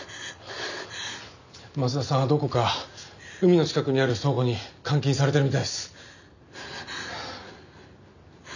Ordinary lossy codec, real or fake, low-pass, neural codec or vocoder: none; real; 7.2 kHz; none